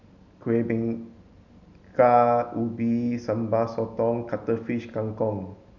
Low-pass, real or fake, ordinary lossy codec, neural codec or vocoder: 7.2 kHz; real; none; none